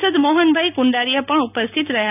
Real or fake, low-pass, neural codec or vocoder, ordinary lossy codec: real; 3.6 kHz; none; none